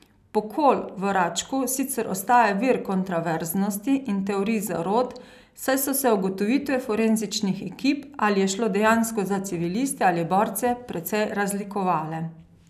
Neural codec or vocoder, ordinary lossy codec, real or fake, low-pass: none; none; real; 14.4 kHz